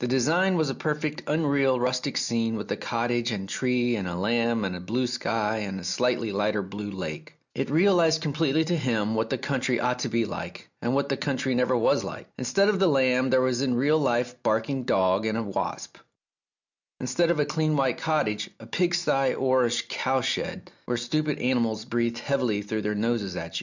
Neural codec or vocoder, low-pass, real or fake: none; 7.2 kHz; real